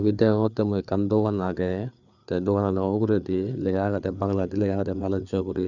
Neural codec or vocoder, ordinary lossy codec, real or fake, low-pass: codec, 16 kHz, 4 kbps, FreqCodec, larger model; none; fake; 7.2 kHz